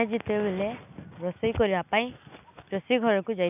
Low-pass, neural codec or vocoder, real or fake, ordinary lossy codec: 3.6 kHz; none; real; none